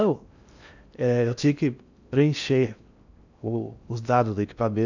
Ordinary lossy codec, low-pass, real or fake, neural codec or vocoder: none; 7.2 kHz; fake; codec, 16 kHz in and 24 kHz out, 0.6 kbps, FocalCodec, streaming, 2048 codes